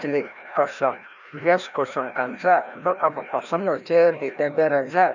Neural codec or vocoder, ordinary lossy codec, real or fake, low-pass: codec, 16 kHz, 1 kbps, FreqCodec, larger model; none; fake; 7.2 kHz